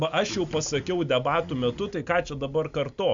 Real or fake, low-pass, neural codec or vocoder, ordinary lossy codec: real; 7.2 kHz; none; Opus, 64 kbps